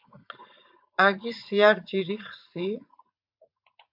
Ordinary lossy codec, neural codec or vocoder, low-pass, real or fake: MP3, 48 kbps; vocoder, 44.1 kHz, 80 mel bands, Vocos; 5.4 kHz; fake